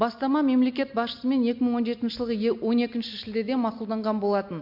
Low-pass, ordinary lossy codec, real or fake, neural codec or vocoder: 5.4 kHz; MP3, 48 kbps; real; none